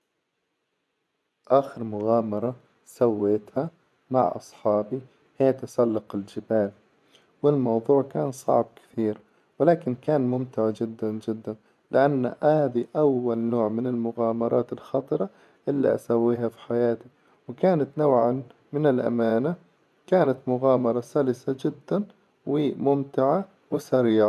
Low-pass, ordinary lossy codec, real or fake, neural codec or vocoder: none; none; fake; vocoder, 24 kHz, 100 mel bands, Vocos